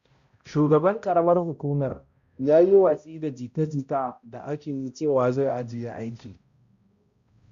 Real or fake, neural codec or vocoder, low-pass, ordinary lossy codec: fake; codec, 16 kHz, 0.5 kbps, X-Codec, HuBERT features, trained on balanced general audio; 7.2 kHz; Opus, 64 kbps